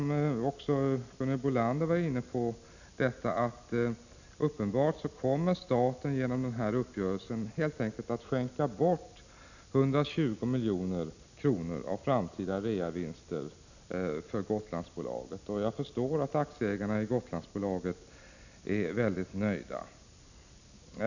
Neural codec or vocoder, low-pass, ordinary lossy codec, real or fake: none; 7.2 kHz; none; real